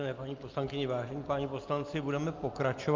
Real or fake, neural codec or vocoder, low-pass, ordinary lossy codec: real; none; 7.2 kHz; Opus, 24 kbps